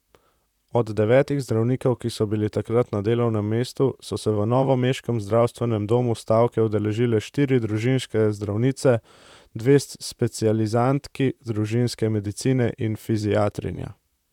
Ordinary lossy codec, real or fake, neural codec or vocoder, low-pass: none; fake; vocoder, 44.1 kHz, 128 mel bands, Pupu-Vocoder; 19.8 kHz